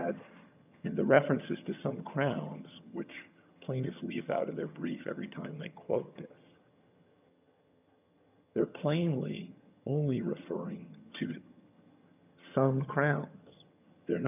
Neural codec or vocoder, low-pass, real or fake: vocoder, 22.05 kHz, 80 mel bands, HiFi-GAN; 3.6 kHz; fake